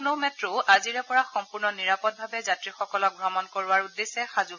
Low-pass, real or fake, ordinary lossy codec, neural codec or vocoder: 7.2 kHz; real; none; none